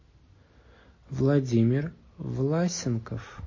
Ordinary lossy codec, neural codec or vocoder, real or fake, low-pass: MP3, 32 kbps; none; real; 7.2 kHz